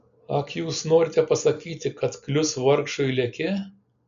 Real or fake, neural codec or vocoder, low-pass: real; none; 7.2 kHz